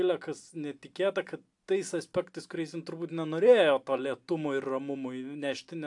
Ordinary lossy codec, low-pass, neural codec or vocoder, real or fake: AAC, 64 kbps; 10.8 kHz; none; real